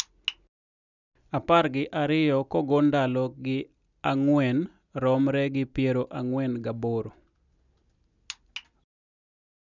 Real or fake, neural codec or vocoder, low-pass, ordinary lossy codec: real; none; 7.2 kHz; none